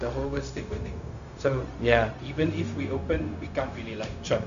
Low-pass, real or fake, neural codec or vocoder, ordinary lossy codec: 7.2 kHz; fake; codec, 16 kHz, 0.4 kbps, LongCat-Audio-Codec; none